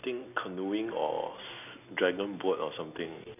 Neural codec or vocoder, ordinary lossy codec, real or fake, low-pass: none; none; real; 3.6 kHz